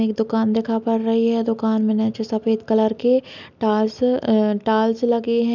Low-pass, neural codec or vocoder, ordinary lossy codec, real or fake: 7.2 kHz; none; none; real